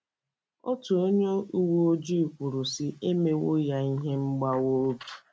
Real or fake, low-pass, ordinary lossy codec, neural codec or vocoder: real; none; none; none